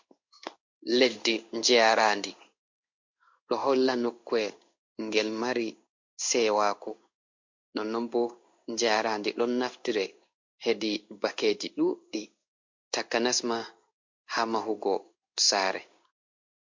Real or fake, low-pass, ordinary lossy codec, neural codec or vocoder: fake; 7.2 kHz; MP3, 48 kbps; codec, 16 kHz in and 24 kHz out, 1 kbps, XY-Tokenizer